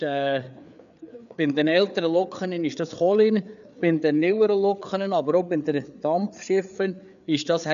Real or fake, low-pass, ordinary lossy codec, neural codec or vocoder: fake; 7.2 kHz; none; codec, 16 kHz, 4 kbps, FreqCodec, larger model